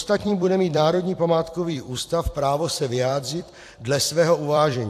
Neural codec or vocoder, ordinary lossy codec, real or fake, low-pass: vocoder, 44.1 kHz, 128 mel bands every 256 samples, BigVGAN v2; AAC, 64 kbps; fake; 14.4 kHz